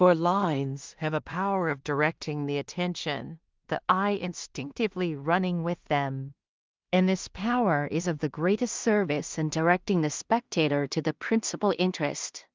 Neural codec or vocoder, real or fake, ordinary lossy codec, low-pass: codec, 16 kHz in and 24 kHz out, 0.4 kbps, LongCat-Audio-Codec, two codebook decoder; fake; Opus, 24 kbps; 7.2 kHz